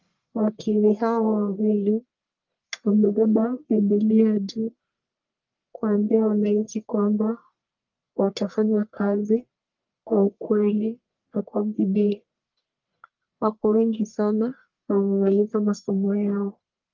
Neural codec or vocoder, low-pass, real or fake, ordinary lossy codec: codec, 44.1 kHz, 1.7 kbps, Pupu-Codec; 7.2 kHz; fake; Opus, 24 kbps